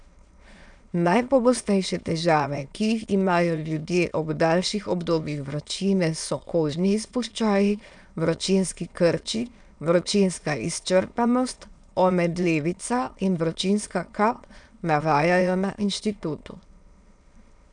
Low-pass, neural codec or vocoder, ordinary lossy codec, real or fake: 9.9 kHz; autoencoder, 22.05 kHz, a latent of 192 numbers a frame, VITS, trained on many speakers; none; fake